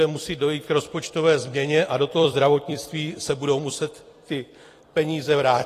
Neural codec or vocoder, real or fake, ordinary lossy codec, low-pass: vocoder, 44.1 kHz, 128 mel bands every 256 samples, BigVGAN v2; fake; AAC, 48 kbps; 14.4 kHz